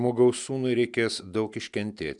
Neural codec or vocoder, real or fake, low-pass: none; real; 10.8 kHz